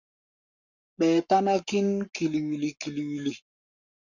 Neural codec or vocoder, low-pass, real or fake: codec, 44.1 kHz, 7.8 kbps, Pupu-Codec; 7.2 kHz; fake